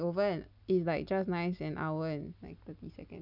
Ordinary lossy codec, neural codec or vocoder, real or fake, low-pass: none; none; real; 5.4 kHz